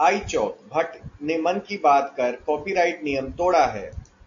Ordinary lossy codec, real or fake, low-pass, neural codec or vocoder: AAC, 48 kbps; real; 7.2 kHz; none